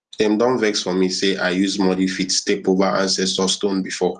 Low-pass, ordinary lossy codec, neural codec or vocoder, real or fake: 10.8 kHz; Opus, 24 kbps; none; real